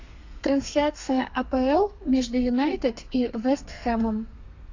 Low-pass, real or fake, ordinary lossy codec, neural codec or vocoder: 7.2 kHz; fake; AAC, 48 kbps; codec, 32 kHz, 1.9 kbps, SNAC